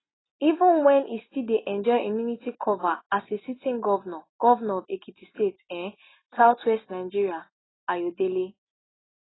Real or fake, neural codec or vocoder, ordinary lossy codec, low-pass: real; none; AAC, 16 kbps; 7.2 kHz